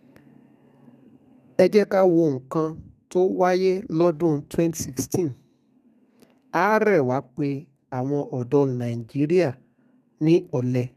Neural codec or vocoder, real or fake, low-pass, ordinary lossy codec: codec, 32 kHz, 1.9 kbps, SNAC; fake; 14.4 kHz; none